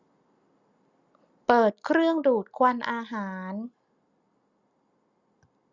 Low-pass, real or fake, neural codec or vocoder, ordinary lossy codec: 7.2 kHz; real; none; Opus, 64 kbps